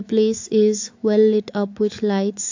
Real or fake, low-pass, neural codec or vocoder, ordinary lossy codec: fake; 7.2 kHz; autoencoder, 48 kHz, 128 numbers a frame, DAC-VAE, trained on Japanese speech; AAC, 48 kbps